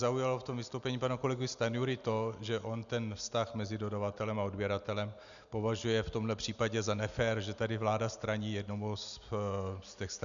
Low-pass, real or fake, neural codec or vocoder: 7.2 kHz; real; none